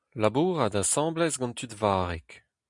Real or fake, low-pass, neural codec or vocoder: real; 10.8 kHz; none